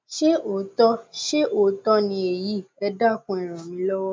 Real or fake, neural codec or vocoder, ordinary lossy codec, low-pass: real; none; none; none